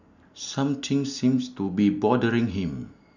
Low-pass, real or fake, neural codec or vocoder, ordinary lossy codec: 7.2 kHz; real; none; none